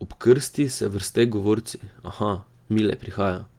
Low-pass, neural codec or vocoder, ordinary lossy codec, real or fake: 19.8 kHz; none; Opus, 24 kbps; real